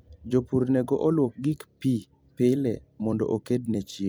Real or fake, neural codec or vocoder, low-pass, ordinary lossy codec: fake; vocoder, 44.1 kHz, 128 mel bands every 256 samples, BigVGAN v2; none; none